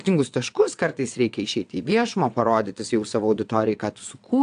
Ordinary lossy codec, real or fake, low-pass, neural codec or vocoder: AAC, 64 kbps; fake; 9.9 kHz; vocoder, 22.05 kHz, 80 mel bands, WaveNeXt